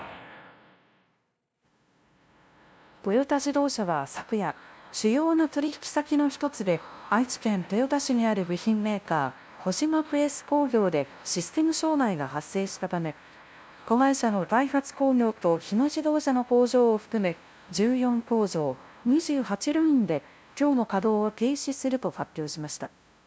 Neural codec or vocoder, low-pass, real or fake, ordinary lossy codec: codec, 16 kHz, 0.5 kbps, FunCodec, trained on LibriTTS, 25 frames a second; none; fake; none